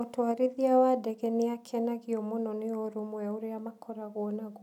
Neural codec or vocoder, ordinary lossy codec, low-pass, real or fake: none; none; 19.8 kHz; real